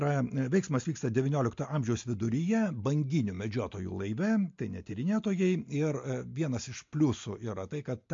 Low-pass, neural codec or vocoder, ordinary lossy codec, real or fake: 7.2 kHz; none; MP3, 48 kbps; real